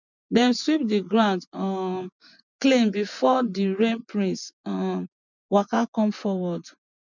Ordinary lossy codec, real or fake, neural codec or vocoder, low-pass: none; fake; vocoder, 24 kHz, 100 mel bands, Vocos; 7.2 kHz